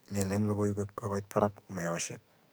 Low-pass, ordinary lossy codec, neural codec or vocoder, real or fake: none; none; codec, 44.1 kHz, 2.6 kbps, SNAC; fake